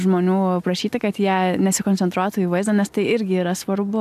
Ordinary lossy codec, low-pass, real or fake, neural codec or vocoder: AAC, 96 kbps; 14.4 kHz; real; none